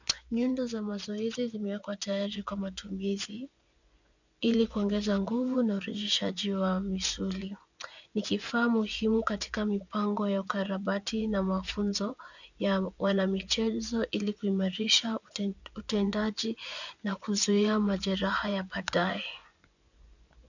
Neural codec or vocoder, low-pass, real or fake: vocoder, 24 kHz, 100 mel bands, Vocos; 7.2 kHz; fake